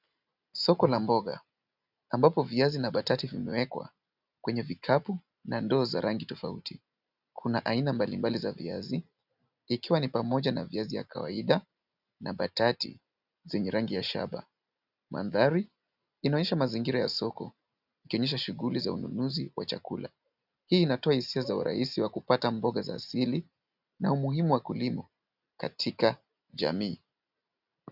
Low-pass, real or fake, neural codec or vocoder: 5.4 kHz; real; none